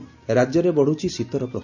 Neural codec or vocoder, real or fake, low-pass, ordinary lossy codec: none; real; 7.2 kHz; none